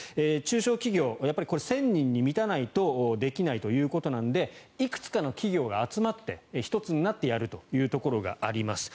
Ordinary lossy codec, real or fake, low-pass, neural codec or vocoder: none; real; none; none